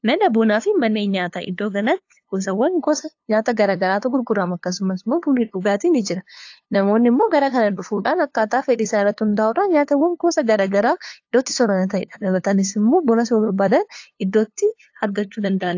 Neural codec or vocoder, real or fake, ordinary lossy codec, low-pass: codec, 16 kHz, 2 kbps, FunCodec, trained on LibriTTS, 25 frames a second; fake; AAC, 48 kbps; 7.2 kHz